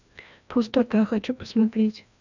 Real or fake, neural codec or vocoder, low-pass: fake; codec, 16 kHz, 1 kbps, FreqCodec, larger model; 7.2 kHz